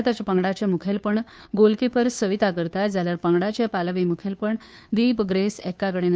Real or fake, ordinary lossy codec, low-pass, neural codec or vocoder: fake; none; none; codec, 16 kHz, 2 kbps, FunCodec, trained on Chinese and English, 25 frames a second